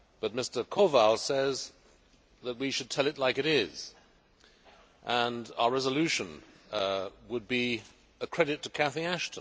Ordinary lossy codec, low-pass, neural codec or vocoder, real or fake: none; none; none; real